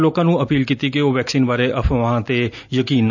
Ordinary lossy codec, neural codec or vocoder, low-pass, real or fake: none; none; 7.2 kHz; real